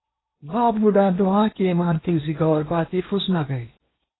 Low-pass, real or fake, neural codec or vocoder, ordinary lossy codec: 7.2 kHz; fake; codec, 16 kHz in and 24 kHz out, 0.8 kbps, FocalCodec, streaming, 65536 codes; AAC, 16 kbps